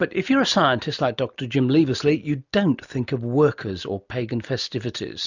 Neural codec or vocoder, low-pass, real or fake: none; 7.2 kHz; real